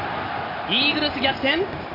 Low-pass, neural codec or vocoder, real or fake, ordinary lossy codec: 5.4 kHz; none; real; MP3, 32 kbps